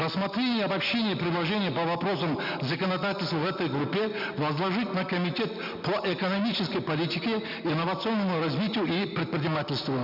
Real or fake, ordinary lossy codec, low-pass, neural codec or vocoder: real; none; 5.4 kHz; none